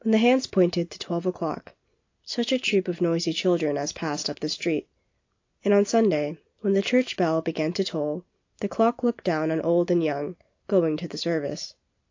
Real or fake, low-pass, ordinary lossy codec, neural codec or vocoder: real; 7.2 kHz; AAC, 48 kbps; none